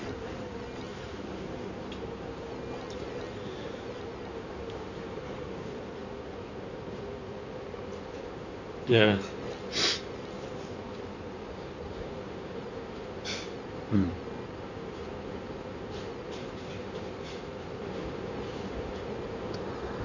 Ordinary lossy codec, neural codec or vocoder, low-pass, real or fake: none; codec, 16 kHz in and 24 kHz out, 2.2 kbps, FireRedTTS-2 codec; 7.2 kHz; fake